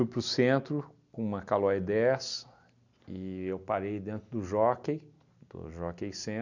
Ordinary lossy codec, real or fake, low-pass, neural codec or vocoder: none; real; 7.2 kHz; none